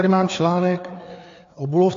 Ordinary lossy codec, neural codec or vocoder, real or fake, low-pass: AAC, 48 kbps; codec, 16 kHz, 4 kbps, FreqCodec, larger model; fake; 7.2 kHz